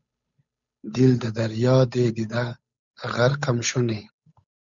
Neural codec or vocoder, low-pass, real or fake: codec, 16 kHz, 8 kbps, FunCodec, trained on Chinese and English, 25 frames a second; 7.2 kHz; fake